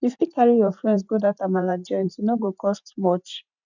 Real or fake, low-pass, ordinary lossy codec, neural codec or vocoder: fake; 7.2 kHz; none; vocoder, 44.1 kHz, 128 mel bands every 512 samples, BigVGAN v2